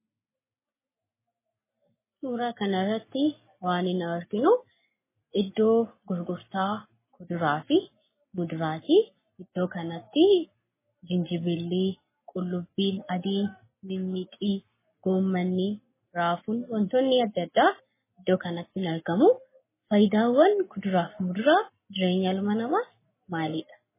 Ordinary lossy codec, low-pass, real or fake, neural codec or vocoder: MP3, 16 kbps; 3.6 kHz; real; none